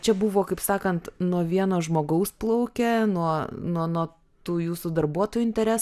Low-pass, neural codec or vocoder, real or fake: 14.4 kHz; none; real